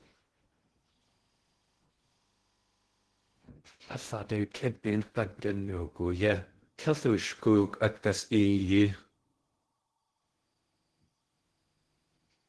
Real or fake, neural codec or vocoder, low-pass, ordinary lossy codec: fake; codec, 16 kHz in and 24 kHz out, 0.6 kbps, FocalCodec, streaming, 2048 codes; 10.8 kHz; Opus, 16 kbps